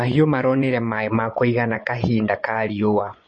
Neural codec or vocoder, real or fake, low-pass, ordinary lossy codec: vocoder, 24 kHz, 100 mel bands, Vocos; fake; 10.8 kHz; MP3, 32 kbps